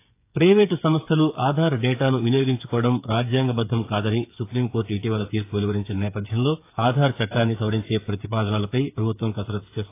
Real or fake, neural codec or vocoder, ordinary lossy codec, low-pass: fake; codec, 16 kHz, 8 kbps, FreqCodec, smaller model; AAC, 24 kbps; 3.6 kHz